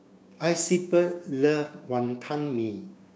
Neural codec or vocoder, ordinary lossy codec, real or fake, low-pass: codec, 16 kHz, 6 kbps, DAC; none; fake; none